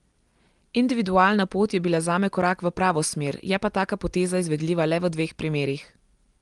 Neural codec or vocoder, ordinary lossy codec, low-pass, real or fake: none; Opus, 24 kbps; 10.8 kHz; real